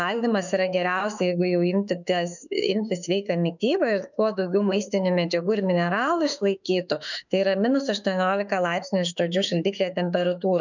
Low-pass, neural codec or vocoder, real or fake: 7.2 kHz; autoencoder, 48 kHz, 32 numbers a frame, DAC-VAE, trained on Japanese speech; fake